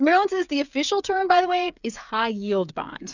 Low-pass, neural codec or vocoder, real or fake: 7.2 kHz; codec, 16 kHz, 8 kbps, FreqCodec, smaller model; fake